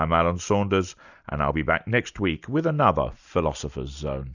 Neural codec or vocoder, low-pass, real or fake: none; 7.2 kHz; real